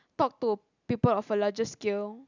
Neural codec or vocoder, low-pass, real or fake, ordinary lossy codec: none; 7.2 kHz; real; none